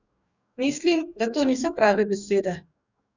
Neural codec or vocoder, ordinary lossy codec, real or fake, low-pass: codec, 44.1 kHz, 2.6 kbps, DAC; none; fake; 7.2 kHz